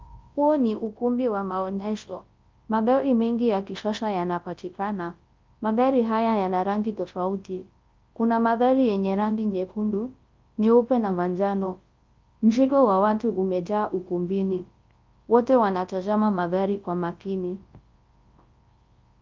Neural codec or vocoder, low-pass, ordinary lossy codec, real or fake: codec, 24 kHz, 0.9 kbps, WavTokenizer, large speech release; 7.2 kHz; Opus, 32 kbps; fake